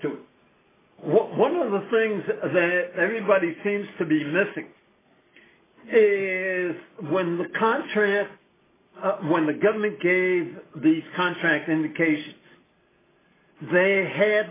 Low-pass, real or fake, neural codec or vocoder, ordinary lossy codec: 3.6 kHz; real; none; AAC, 16 kbps